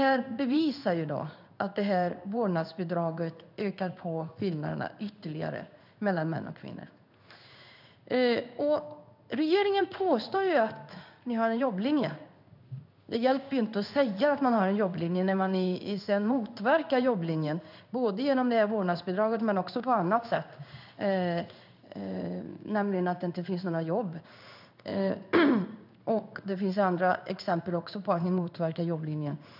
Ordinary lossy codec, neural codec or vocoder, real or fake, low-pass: none; codec, 16 kHz in and 24 kHz out, 1 kbps, XY-Tokenizer; fake; 5.4 kHz